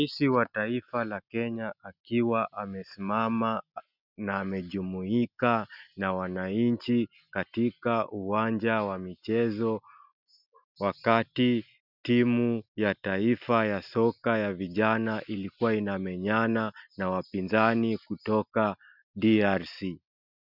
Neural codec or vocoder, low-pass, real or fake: none; 5.4 kHz; real